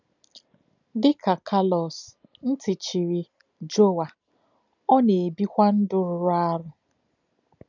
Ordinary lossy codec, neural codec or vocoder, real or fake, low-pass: none; none; real; 7.2 kHz